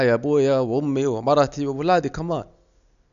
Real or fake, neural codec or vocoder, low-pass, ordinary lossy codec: real; none; 7.2 kHz; none